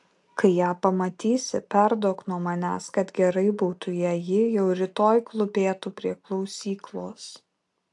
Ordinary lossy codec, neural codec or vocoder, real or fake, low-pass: AAC, 64 kbps; none; real; 10.8 kHz